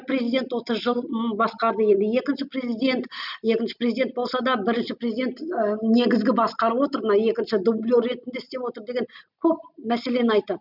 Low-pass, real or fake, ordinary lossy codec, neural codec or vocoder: 5.4 kHz; real; none; none